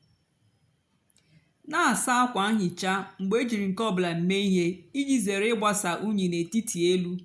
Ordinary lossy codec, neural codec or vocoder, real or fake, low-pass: none; none; real; none